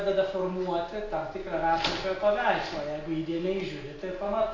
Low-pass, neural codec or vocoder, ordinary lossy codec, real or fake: 7.2 kHz; none; AAC, 32 kbps; real